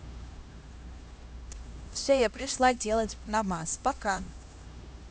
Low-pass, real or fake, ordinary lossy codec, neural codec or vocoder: none; fake; none; codec, 16 kHz, 1 kbps, X-Codec, HuBERT features, trained on LibriSpeech